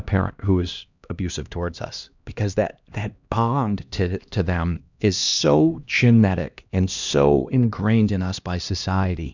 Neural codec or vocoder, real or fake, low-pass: codec, 16 kHz, 1 kbps, X-Codec, HuBERT features, trained on LibriSpeech; fake; 7.2 kHz